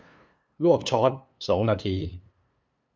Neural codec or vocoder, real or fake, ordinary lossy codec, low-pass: codec, 16 kHz, 2 kbps, FunCodec, trained on LibriTTS, 25 frames a second; fake; none; none